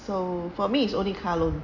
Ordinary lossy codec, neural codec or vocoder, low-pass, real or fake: none; none; 7.2 kHz; real